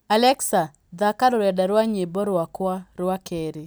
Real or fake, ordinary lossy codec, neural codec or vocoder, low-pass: real; none; none; none